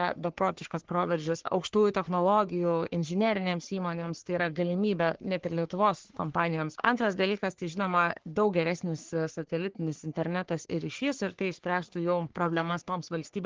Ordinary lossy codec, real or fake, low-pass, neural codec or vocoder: Opus, 16 kbps; fake; 7.2 kHz; codec, 44.1 kHz, 3.4 kbps, Pupu-Codec